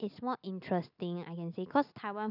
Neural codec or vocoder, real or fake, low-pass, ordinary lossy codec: none; real; 5.4 kHz; none